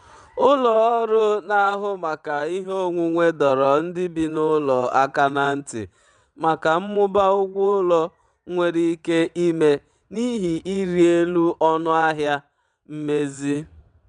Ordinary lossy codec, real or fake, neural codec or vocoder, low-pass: none; fake; vocoder, 22.05 kHz, 80 mel bands, WaveNeXt; 9.9 kHz